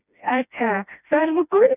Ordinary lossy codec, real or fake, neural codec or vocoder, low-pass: none; fake; codec, 16 kHz, 1 kbps, FreqCodec, smaller model; 3.6 kHz